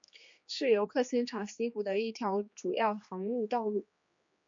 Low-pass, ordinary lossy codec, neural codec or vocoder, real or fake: 7.2 kHz; MP3, 48 kbps; codec, 16 kHz, 2 kbps, X-Codec, HuBERT features, trained on general audio; fake